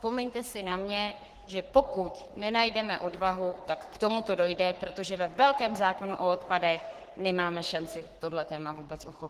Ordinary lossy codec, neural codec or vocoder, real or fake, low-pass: Opus, 16 kbps; codec, 44.1 kHz, 3.4 kbps, Pupu-Codec; fake; 14.4 kHz